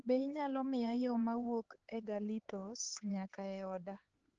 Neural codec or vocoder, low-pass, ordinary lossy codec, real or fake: codec, 16 kHz, 4 kbps, X-Codec, HuBERT features, trained on LibriSpeech; 7.2 kHz; Opus, 16 kbps; fake